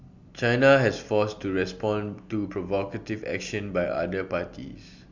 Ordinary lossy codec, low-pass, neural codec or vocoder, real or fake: MP3, 64 kbps; 7.2 kHz; none; real